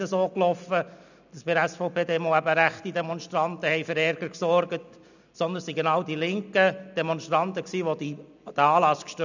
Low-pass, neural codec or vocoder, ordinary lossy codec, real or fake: 7.2 kHz; none; none; real